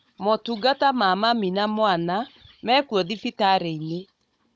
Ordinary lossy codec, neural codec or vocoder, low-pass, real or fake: none; codec, 16 kHz, 16 kbps, FunCodec, trained on Chinese and English, 50 frames a second; none; fake